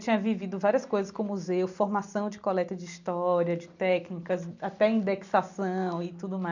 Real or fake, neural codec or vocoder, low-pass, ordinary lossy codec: real; none; 7.2 kHz; none